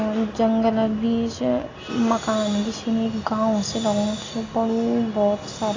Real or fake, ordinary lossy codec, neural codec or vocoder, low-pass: real; AAC, 32 kbps; none; 7.2 kHz